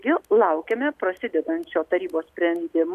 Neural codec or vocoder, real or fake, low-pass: none; real; 14.4 kHz